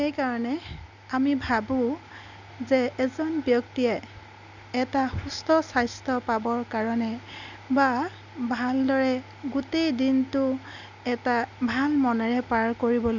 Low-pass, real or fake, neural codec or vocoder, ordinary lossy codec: 7.2 kHz; real; none; Opus, 64 kbps